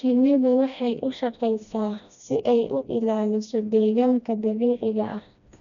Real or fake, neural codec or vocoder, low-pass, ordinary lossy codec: fake; codec, 16 kHz, 1 kbps, FreqCodec, smaller model; 7.2 kHz; none